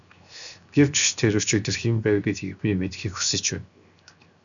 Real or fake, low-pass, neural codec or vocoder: fake; 7.2 kHz; codec, 16 kHz, 0.7 kbps, FocalCodec